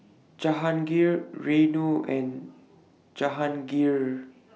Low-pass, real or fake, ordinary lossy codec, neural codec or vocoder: none; real; none; none